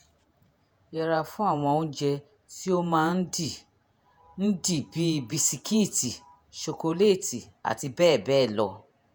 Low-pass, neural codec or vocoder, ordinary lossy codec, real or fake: none; vocoder, 48 kHz, 128 mel bands, Vocos; none; fake